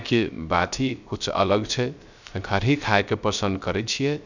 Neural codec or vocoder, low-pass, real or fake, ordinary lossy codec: codec, 16 kHz, 0.3 kbps, FocalCodec; 7.2 kHz; fake; none